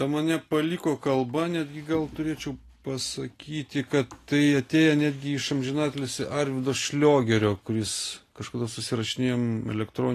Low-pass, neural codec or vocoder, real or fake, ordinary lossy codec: 14.4 kHz; none; real; AAC, 48 kbps